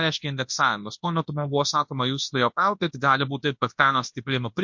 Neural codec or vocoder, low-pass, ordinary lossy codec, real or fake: codec, 24 kHz, 0.9 kbps, WavTokenizer, large speech release; 7.2 kHz; MP3, 48 kbps; fake